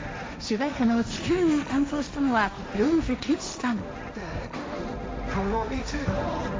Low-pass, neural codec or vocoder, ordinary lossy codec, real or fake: none; codec, 16 kHz, 1.1 kbps, Voila-Tokenizer; none; fake